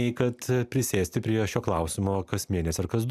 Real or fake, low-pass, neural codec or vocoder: real; 14.4 kHz; none